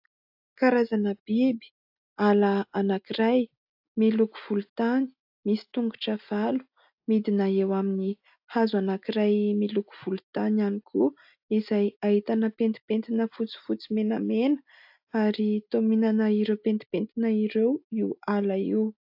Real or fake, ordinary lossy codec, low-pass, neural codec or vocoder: real; AAC, 48 kbps; 5.4 kHz; none